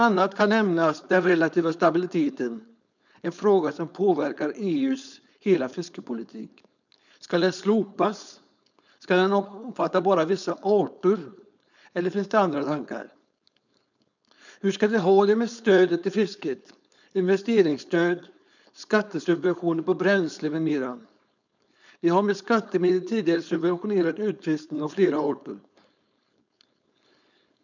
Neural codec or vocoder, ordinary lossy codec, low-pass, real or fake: codec, 16 kHz, 4.8 kbps, FACodec; none; 7.2 kHz; fake